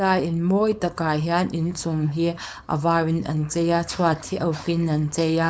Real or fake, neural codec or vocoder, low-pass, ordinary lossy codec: fake; codec, 16 kHz, 4.8 kbps, FACodec; none; none